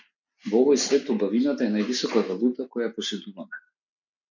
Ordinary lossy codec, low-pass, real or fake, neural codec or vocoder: MP3, 64 kbps; 7.2 kHz; real; none